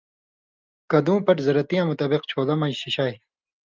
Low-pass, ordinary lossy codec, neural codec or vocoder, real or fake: 7.2 kHz; Opus, 32 kbps; none; real